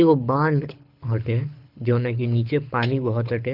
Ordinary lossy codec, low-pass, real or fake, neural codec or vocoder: Opus, 32 kbps; 5.4 kHz; fake; codec, 24 kHz, 6 kbps, HILCodec